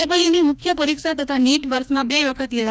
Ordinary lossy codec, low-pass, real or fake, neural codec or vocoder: none; none; fake; codec, 16 kHz, 1 kbps, FreqCodec, larger model